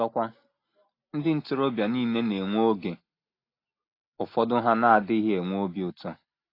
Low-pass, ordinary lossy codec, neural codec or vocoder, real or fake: 5.4 kHz; AAC, 32 kbps; none; real